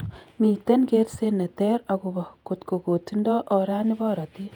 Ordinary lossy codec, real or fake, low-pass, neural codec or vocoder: none; fake; 19.8 kHz; vocoder, 48 kHz, 128 mel bands, Vocos